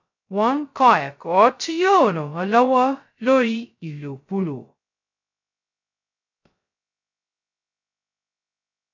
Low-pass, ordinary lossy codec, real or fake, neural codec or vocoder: 7.2 kHz; none; fake; codec, 16 kHz, 0.2 kbps, FocalCodec